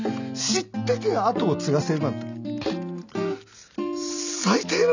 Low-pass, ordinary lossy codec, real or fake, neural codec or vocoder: 7.2 kHz; none; real; none